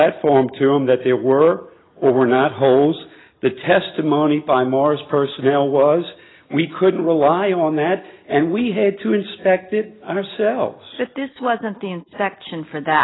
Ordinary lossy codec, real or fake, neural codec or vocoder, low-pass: AAC, 16 kbps; real; none; 7.2 kHz